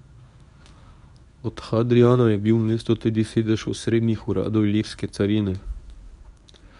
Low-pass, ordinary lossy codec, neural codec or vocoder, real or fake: 10.8 kHz; none; codec, 24 kHz, 0.9 kbps, WavTokenizer, medium speech release version 1; fake